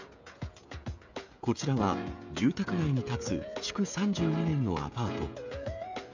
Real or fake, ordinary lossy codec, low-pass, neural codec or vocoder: fake; none; 7.2 kHz; codec, 16 kHz, 16 kbps, FreqCodec, smaller model